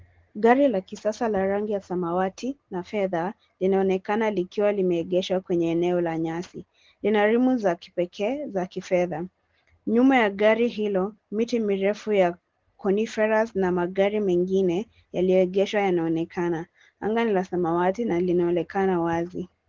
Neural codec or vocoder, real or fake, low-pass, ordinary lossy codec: none; real; 7.2 kHz; Opus, 16 kbps